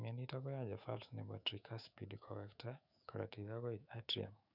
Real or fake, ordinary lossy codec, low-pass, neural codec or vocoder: fake; none; 5.4 kHz; autoencoder, 48 kHz, 128 numbers a frame, DAC-VAE, trained on Japanese speech